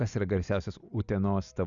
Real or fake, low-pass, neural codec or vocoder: real; 7.2 kHz; none